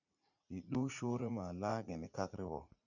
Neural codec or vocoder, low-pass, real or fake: vocoder, 44.1 kHz, 80 mel bands, Vocos; 7.2 kHz; fake